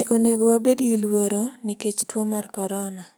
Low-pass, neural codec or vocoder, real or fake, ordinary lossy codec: none; codec, 44.1 kHz, 2.6 kbps, SNAC; fake; none